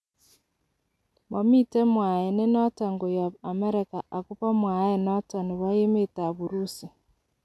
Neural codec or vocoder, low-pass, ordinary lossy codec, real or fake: none; none; none; real